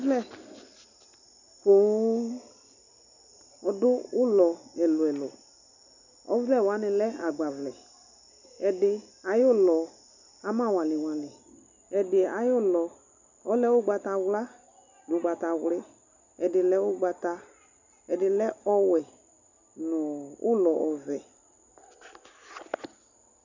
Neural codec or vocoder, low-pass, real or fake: none; 7.2 kHz; real